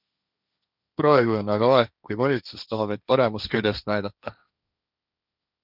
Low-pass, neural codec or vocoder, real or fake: 5.4 kHz; codec, 16 kHz, 1.1 kbps, Voila-Tokenizer; fake